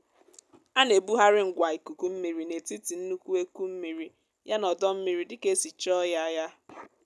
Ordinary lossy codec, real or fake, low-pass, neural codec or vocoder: none; real; none; none